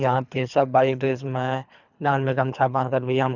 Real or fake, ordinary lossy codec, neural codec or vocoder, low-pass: fake; none; codec, 24 kHz, 3 kbps, HILCodec; 7.2 kHz